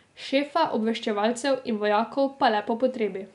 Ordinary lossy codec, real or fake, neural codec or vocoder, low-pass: none; real; none; 10.8 kHz